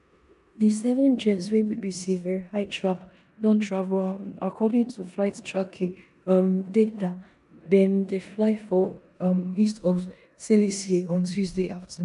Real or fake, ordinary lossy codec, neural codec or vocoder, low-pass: fake; none; codec, 16 kHz in and 24 kHz out, 0.9 kbps, LongCat-Audio-Codec, four codebook decoder; 10.8 kHz